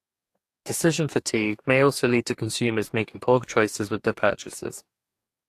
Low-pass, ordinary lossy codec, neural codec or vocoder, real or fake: 14.4 kHz; AAC, 64 kbps; codec, 44.1 kHz, 2.6 kbps, DAC; fake